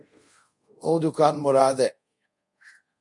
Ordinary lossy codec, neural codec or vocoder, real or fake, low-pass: MP3, 48 kbps; codec, 24 kHz, 0.5 kbps, DualCodec; fake; 10.8 kHz